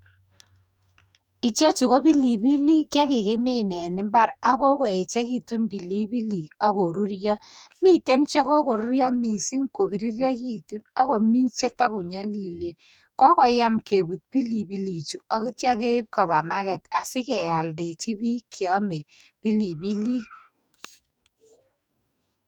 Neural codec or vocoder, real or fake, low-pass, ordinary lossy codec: codec, 44.1 kHz, 2.6 kbps, DAC; fake; 19.8 kHz; none